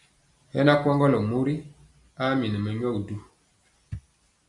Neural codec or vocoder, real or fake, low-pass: none; real; 10.8 kHz